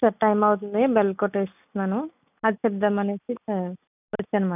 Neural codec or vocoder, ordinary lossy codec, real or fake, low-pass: none; none; real; 3.6 kHz